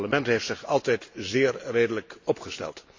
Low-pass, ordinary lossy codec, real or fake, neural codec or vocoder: 7.2 kHz; AAC, 48 kbps; real; none